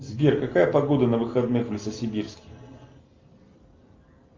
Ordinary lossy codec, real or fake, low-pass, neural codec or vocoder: Opus, 32 kbps; real; 7.2 kHz; none